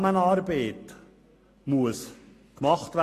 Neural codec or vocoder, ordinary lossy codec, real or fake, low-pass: none; AAC, 48 kbps; real; 14.4 kHz